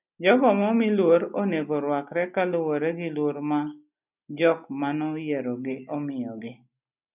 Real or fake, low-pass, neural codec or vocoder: real; 3.6 kHz; none